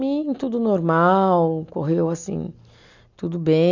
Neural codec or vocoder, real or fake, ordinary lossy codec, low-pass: none; real; none; 7.2 kHz